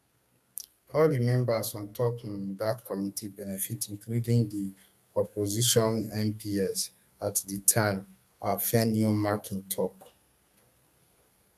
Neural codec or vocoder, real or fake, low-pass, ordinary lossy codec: codec, 32 kHz, 1.9 kbps, SNAC; fake; 14.4 kHz; none